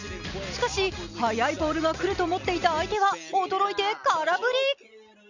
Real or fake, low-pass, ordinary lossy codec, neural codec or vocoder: real; 7.2 kHz; none; none